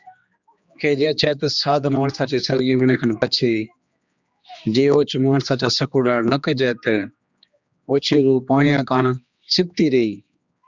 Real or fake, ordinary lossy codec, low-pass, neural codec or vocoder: fake; Opus, 64 kbps; 7.2 kHz; codec, 16 kHz, 2 kbps, X-Codec, HuBERT features, trained on general audio